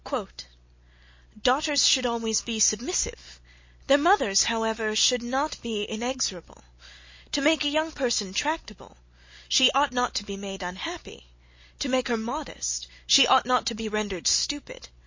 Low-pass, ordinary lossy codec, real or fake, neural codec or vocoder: 7.2 kHz; MP3, 32 kbps; real; none